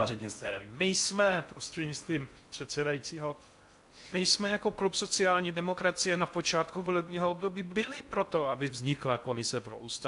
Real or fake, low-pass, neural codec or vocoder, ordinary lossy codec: fake; 10.8 kHz; codec, 16 kHz in and 24 kHz out, 0.6 kbps, FocalCodec, streaming, 4096 codes; AAC, 64 kbps